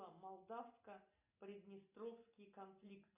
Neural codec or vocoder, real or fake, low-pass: none; real; 3.6 kHz